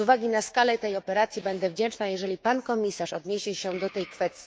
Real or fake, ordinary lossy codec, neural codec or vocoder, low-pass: fake; none; codec, 16 kHz, 6 kbps, DAC; none